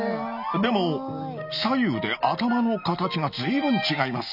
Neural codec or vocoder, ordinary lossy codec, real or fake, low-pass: none; none; real; 5.4 kHz